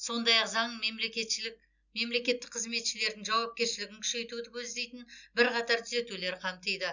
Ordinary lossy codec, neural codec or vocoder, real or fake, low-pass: none; none; real; 7.2 kHz